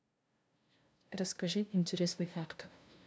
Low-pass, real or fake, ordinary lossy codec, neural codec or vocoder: none; fake; none; codec, 16 kHz, 0.5 kbps, FunCodec, trained on LibriTTS, 25 frames a second